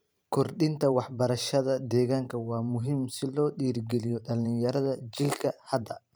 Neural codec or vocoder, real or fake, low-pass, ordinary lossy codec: vocoder, 44.1 kHz, 128 mel bands every 512 samples, BigVGAN v2; fake; none; none